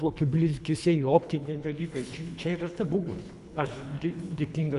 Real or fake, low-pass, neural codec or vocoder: fake; 10.8 kHz; codec, 24 kHz, 3 kbps, HILCodec